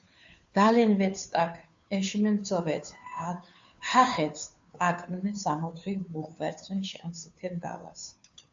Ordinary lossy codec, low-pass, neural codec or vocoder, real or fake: AAC, 64 kbps; 7.2 kHz; codec, 16 kHz, 2 kbps, FunCodec, trained on Chinese and English, 25 frames a second; fake